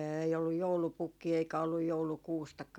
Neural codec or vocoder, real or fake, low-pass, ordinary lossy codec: none; real; 19.8 kHz; none